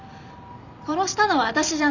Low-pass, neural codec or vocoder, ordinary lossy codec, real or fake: 7.2 kHz; none; none; real